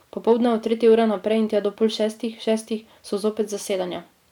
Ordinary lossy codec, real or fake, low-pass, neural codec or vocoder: none; real; 19.8 kHz; none